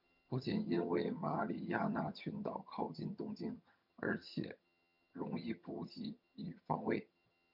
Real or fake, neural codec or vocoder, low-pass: fake; vocoder, 22.05 kHz, 80 mel bands, HiFi-GAN; 5.4 kHz